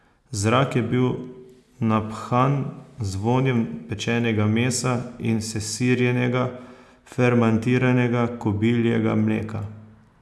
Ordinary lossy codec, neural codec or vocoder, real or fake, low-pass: none; none; real; none